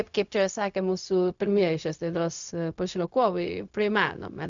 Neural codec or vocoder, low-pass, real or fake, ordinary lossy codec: codec, 16 kHz, 0.4 kbps, LongCat-Audio-Codec; 7.2 kHz; fake; MP3, 96 kbps